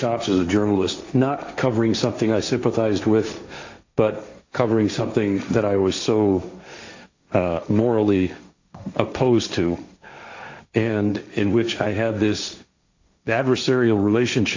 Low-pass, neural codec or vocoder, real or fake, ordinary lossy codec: 7.2 kHz; codec, 16 kHz, 1.1 kbps, Voila-Tokenizer; fake; AAC, 48 kbps